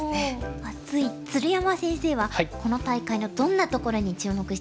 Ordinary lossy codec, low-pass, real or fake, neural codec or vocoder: none; none; real; none